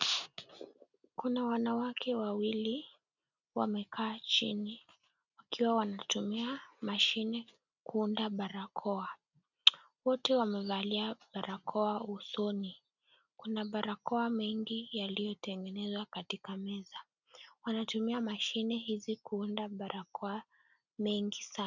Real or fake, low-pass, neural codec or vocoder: real; 7.2 kHz; none